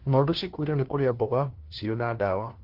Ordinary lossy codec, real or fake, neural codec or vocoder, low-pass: Opus, 16 kbps; fake; codec, 16 kHz, 1 kbps, FunCodec, trained on LibriTTS, 50 frames a second; 5.4 kHz